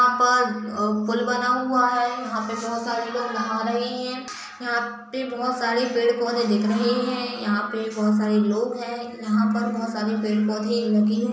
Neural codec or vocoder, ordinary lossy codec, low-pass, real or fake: none; none; none; real